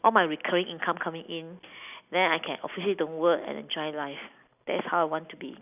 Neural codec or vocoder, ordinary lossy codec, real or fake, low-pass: none; none; real; 3.6 kHz